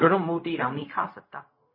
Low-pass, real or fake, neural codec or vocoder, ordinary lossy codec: 5.4 kHz; fake; codec, 16 kHz, 0.4 kbps, LongCat-Audio-Codec; MP3, 24 kbps